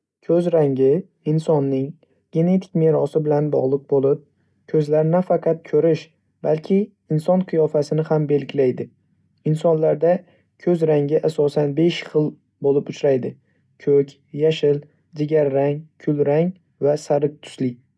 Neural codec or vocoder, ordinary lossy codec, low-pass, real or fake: none; none; none; real